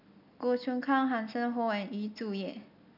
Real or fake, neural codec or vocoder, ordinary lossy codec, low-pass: real; none; none; 5.4 kHz